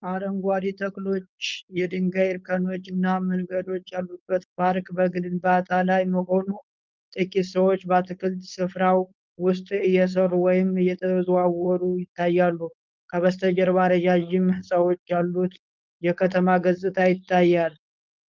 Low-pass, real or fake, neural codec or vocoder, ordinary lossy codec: 7.2 kHz; fake; codec, 16 kHz, 4.8 kbps, FACodec; Opus, 24 kbps